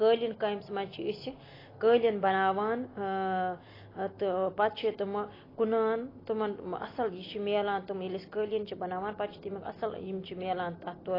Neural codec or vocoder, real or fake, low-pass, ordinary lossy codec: none; real; 5.4 kHz; AAC, 24 kbps